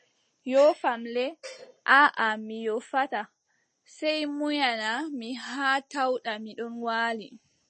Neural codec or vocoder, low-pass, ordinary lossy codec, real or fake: none; 9.9 kHz; MP3, 32 kbps; real